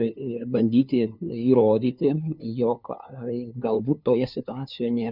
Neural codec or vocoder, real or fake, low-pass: codec, 16 kHz, 2 kbps, FunCodec, trained on LibriTTS, 25 frames a second; fake; 5.4 kHz